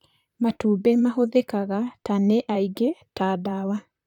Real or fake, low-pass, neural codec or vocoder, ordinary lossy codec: fake; 19.8 kHz; vocoder, 44.1 kHz, 128 mel bands, Pupu-Vocoder; none